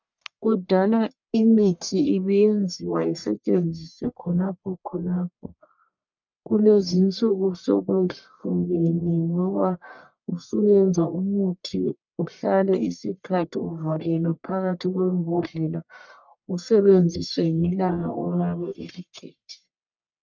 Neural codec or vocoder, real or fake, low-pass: codec, 44.1 kHz, 1.7 kbps, Pupu-Codec; fake; 7.2 kHz